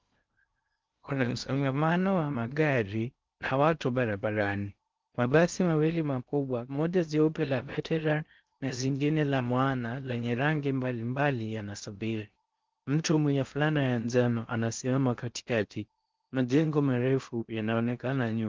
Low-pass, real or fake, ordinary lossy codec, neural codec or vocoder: 7.2 kHz; fake; Opus, 32 kbps; codec, 16 kHz in and 24 kHz out, 0.6 kbps, FocalCodec, streaming, 2048 codes